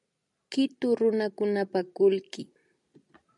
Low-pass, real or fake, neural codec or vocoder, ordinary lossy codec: 10.8 kHz; real; none; MP3, 96 kbps